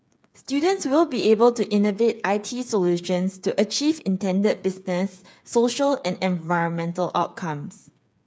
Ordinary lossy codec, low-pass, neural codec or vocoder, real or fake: none; none; codec, 16 kHz, 8 kbps, FreqCodec, smaller model; fake